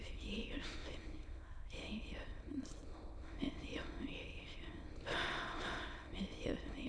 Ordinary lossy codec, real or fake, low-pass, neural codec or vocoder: MP3, 96 kbps; fake; 9.9 kHz; autoencoder, 22.05 kHz, a latent of 192 numbers a frame, VITS, trained on many speakers